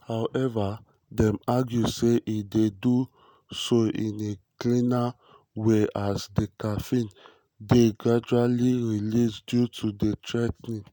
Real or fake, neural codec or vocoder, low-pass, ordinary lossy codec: real; none; none; none